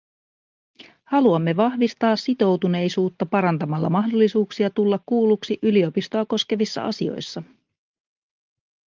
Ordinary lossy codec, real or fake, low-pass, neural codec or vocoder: Opus, 24 kbps; real; 7.2 kHz; none